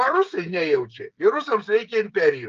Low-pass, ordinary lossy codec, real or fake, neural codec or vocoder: 7.2 kHz; Opus, 24 kbps; fake; codec, 16 kHz, 8 kbps, FreqCodec, smaller model